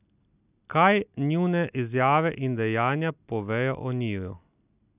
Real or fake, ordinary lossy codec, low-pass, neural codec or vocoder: real; none; 3.6 kHz; none